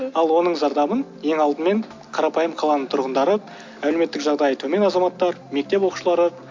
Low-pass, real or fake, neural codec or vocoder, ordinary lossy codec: 7.2 kHz; real; none; MP3, 48 kbps